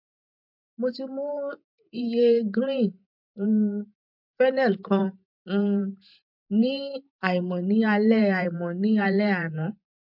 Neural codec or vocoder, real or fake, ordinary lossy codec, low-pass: vocoder, 44.1 kHz, 128 mel bands every 512 samples, BigVGAN v2; fake; MP3, 48 kbps; 5.4 kHz